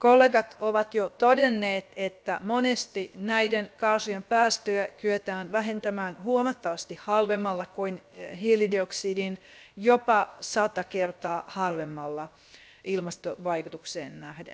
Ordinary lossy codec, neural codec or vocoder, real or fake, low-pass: none; codec, 16 kHz, about 1 kbps, DyCAST, with the encoder's durations; fake; none